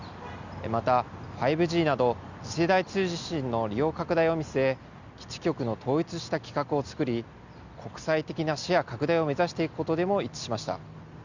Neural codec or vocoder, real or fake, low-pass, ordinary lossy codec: none; real; 7.2 kHz; Opus, 64 kbps